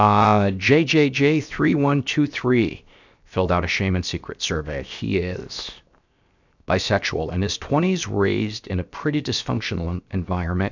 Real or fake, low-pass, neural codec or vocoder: fake; 7.2 kHz; codec, 16 kHz, 0.7 kbps, FocalCodec